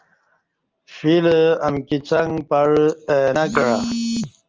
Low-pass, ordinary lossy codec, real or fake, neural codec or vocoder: 7.2 kHz; Opus, 24 kbps; real; none